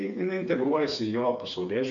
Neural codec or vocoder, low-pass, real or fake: codec, 16 kHz, 4 kbps, FreqCodec, smaller model; 7.2 kHz; fake